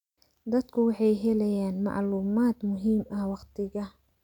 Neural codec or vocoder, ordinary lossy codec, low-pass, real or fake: none; none; 19.8 kHz; real